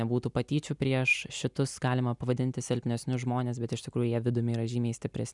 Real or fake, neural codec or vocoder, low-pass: real; none; 10.8 kHz